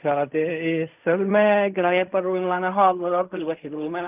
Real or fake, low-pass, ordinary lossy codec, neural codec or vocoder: fake; 3.6 kHz; none; codec, 16 kHz in and 24 kHz out, 0.4 kbps, LongCat-Audio-Codec, fine tuned four codebook decoder